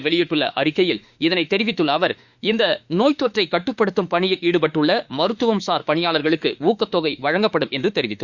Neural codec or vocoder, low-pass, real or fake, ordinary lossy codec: autoencoder, 48 kHz, 32 numbers a frame, DAC-VAE, trained on Japanese speech; 7.2 kHz; fake; Opus, 64 kbps